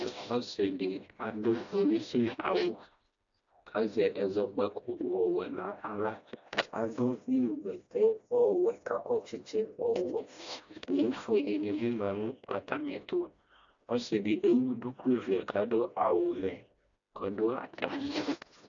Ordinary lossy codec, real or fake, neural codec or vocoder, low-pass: AAC, 64 kbps; fake; codec, 16 kHz, 1 kbps, FreqCodec, smaller model; 7.2 kHz